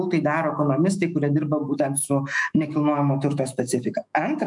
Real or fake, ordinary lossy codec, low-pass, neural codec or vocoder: fake; MP3, 96 kbps; 10.8 kHz; autoencoder, 48 kHz, 128 numbers a frame, DAC-VAE, trained on Japanese speech